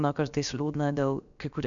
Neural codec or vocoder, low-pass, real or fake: codec, 16 kHz, about 1 kbps, DyCAST, with the encoder's durations; 7.2 kHz; fake